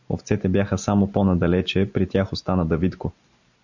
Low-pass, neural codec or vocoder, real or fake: 7.2 kHz; none; real